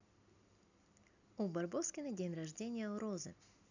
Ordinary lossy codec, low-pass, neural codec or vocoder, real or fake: none; 7.2 kHz; none; real